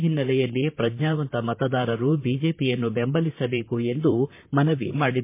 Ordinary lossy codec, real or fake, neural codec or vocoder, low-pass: MP3, 24 kbps; fake; vocoder, 44.1 kHz, 128 mel bands, Pupu-Vocoder; 3.6 kHz